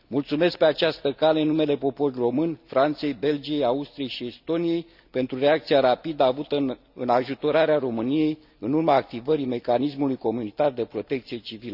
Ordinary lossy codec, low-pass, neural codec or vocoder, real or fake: none; 5.4 kHz; none; real